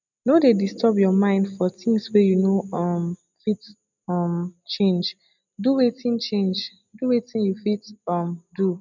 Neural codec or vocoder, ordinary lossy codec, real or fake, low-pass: none; none; real; 7.2 kHz